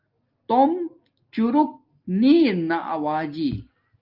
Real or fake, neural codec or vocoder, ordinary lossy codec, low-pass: real; none; Opus, 32 kbps; 5.4 kHz